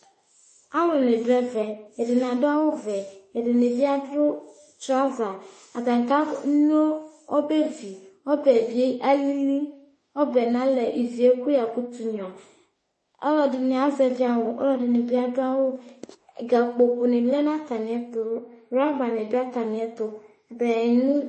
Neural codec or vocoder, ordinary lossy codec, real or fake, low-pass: autoencoder, 48 kHz, 32 numbers a frame, DAC-VAE, trained on Japanese speech; MP3, 32 kbps; fake; 10.8 kHz